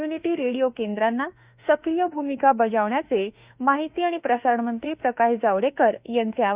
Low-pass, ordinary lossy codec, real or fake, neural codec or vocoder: 3.6 kHz; Opus, 64 kbps; fake; autoencoder, 48 kHz, 32 numbers a frame, DAC-VAE, trained on Japanese speech